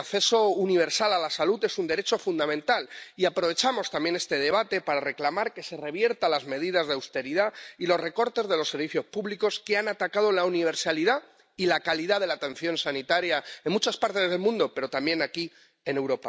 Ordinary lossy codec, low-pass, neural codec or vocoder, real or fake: none; none; none; real